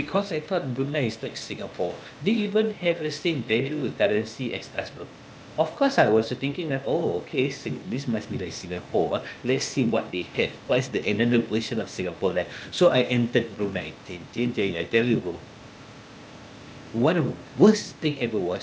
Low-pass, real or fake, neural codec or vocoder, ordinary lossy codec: none; fake; codec, 16 kHz, 0.8 kbps, ZipCodec; none